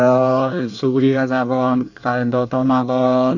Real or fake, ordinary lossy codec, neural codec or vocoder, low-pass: fake; none; codec, 24 kHz, 1 kbps, SNAC; 7.2 kHz